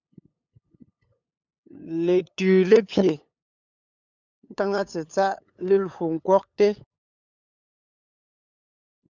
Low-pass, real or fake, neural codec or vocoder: 7.2 kHz; fake; codec, 16 kHz, 8 kbps, FunCodec, trained on LibriTTS, 25 frames a second